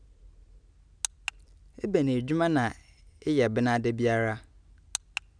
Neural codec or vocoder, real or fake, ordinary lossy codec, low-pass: none; real; none; 9.9 kHz